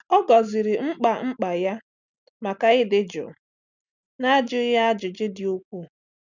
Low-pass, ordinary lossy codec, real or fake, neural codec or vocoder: 7.2 kHz; none; real; none